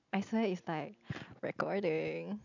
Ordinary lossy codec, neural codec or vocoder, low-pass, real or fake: none; none; 7.2 kHz; real